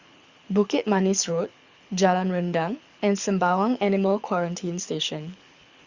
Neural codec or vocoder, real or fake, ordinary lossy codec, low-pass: codec, 24 kHz, 6 kbps, HILCodec; fake; Opus, 64 kbps; 7.2 kHz